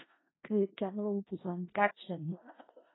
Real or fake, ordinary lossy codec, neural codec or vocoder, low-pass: fake; AAC, 16 kbps; codec, 16 kHz in and 24 kHz out, 0.4 kbps, LongCat-Audio-Codec, four codebook decoder; 7.2 kHz